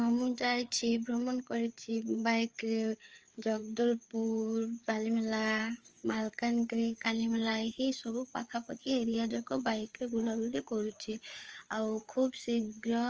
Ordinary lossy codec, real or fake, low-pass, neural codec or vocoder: Opus, 24 kbps; fake; 7.2 kHz; codec, 16 kHz, 4 kbps, FreqCodec, larger model